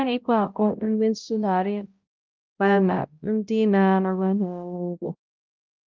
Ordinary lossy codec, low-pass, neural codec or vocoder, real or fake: Opus, 24 kbps; 7.2 kHz; codec, 16 kHz, 0.5 kbps, X-Codec, HuBERT features, trained on balanced general audio; fake